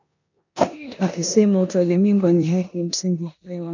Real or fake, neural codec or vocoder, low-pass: fake; codec, 16 kHz in and 24 kHz out, 0.9 kbps, LongCat-Audio-Codec, four codebook decoder; 7.2 kHz